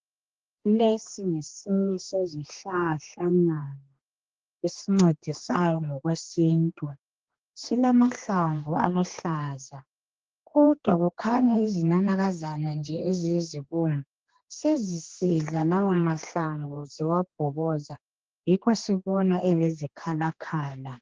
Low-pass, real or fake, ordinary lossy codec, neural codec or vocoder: 7.2 kHz; fake; Opus, 16 kbps; codec, 16 kHz, 2 kbps, X-Codec, HuBERT features, trained on general audio